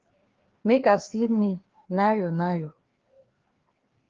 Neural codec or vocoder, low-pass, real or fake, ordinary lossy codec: codec, 16 kHz, 2 kbps, FreqCodec, larger model; 7.2 kHz; fake; Opus, 16 kbps